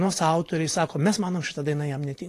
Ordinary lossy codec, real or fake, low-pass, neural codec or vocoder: AAC, 48 kbps; fake; 14.4 kHz; vocoder, 44.1 kHz, 128 mel bands every 512 samples, BigVGAN v2